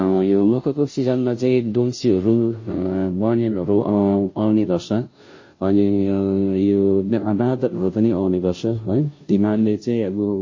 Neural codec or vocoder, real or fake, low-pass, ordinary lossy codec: codec, 16 kHz, 0.5 kbps, FunCodec, trained on Chinese and English, 25 frames a second; fake; 7.2 kHz; MP3, 32 kbps